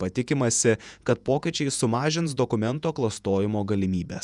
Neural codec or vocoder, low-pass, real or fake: vocoder, 48 kHz, 128 mel bands, Vocos; 10.8 kHz; fake